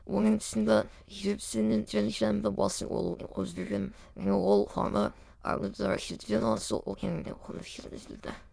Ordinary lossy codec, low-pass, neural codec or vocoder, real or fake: none; none; autoencoder, 22.05 kHz, a latent of 192 numbers a frame, VITS, trained on many speakers; fake